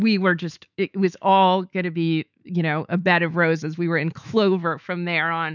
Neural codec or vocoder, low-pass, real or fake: autoencoder, 48 kHz, 128 numbers a frame, DAC-VAE, trained on Japanese speech; 7.2 kHz; fake